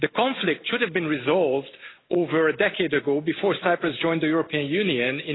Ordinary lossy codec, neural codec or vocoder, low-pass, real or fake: AAC, 16 kbps; none; 7.2 kHz; real